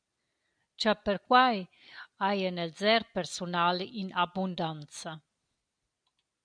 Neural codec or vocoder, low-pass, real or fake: none; 9.9 kHz; real